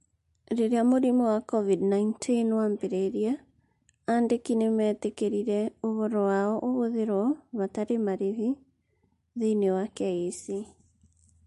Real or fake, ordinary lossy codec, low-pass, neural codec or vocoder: real; MP3, 48 kbps; 14.4 kHz; none